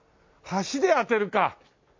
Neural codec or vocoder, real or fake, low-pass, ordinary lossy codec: none; real; 7.2 kHz; MP3, 48 kbps